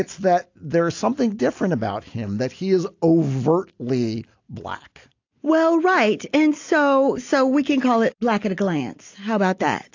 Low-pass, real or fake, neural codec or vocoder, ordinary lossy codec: 7.2 kHz; real; none; AAC, 48 kbps